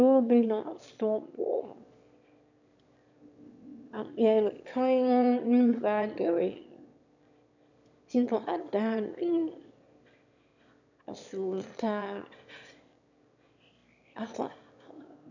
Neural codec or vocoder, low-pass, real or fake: autoencoder, 22.05 kHz, a latent of 192 numbers a frame, VITS, trained on one speaker; 7.2 kHz; fake